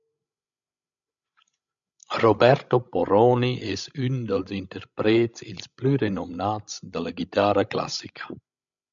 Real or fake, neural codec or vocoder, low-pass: fake; codec, 16 kHz, 16 kbps, FreqCodec, larger model; 7.2 kHz